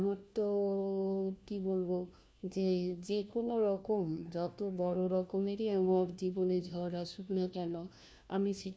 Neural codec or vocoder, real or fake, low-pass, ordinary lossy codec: codec, 16 kHz, 1 kbps, FunCodec, trained on LibriTTS, 50 frames a second; fake; none; none